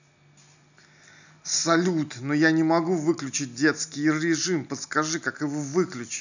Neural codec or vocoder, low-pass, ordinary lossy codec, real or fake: none; 7.2 kHz; none; real